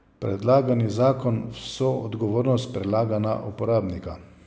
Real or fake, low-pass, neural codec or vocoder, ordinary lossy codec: real; none; none; none